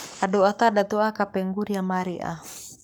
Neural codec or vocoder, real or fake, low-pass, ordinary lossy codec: codec, 44.1 kHz, 7.8 kbps, Pupu-Codec; fake; none; none